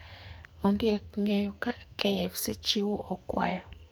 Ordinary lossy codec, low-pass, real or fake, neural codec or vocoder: none; none; fake; codec, 44.1 kHz, 2.6 kbps, SNAC